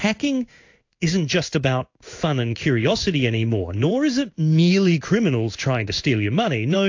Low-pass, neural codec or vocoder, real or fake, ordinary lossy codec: 7.2 kHz; codec, 16 kHz in and 24 kHz out, 1 kbps, XY-Tokenizer; fake; AAC, 48 kbps